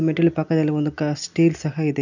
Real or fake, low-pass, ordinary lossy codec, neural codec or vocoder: real; 7.2 kHz; none; none